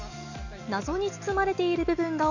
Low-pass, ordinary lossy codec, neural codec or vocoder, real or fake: 7.2 kHz; none; none; real